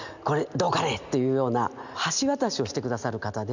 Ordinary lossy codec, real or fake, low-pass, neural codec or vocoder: none; real; 7.2 kHz; none